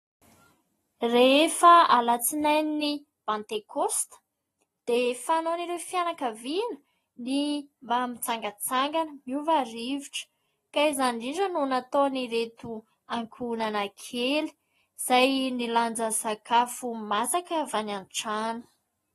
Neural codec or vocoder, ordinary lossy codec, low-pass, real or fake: none; AAC, 32 kbps; 19.8 kHz; real